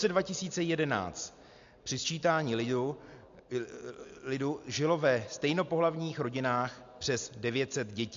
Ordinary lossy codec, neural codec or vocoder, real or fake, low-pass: AAC, 64 kbps; none; real; 7.2 kHz